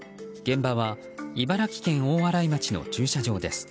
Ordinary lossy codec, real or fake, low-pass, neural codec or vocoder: none; real; none; none